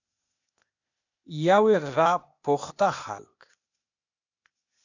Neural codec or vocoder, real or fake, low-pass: codec, 16 kHz, 0.8 kbps, ZipCodec; fake; 7.2 kHz